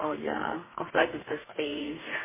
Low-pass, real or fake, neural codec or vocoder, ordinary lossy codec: 3.6 kHz; fake; codec, 32 kHz, 1.9 kbps, SNAC; MP3, 16 kbps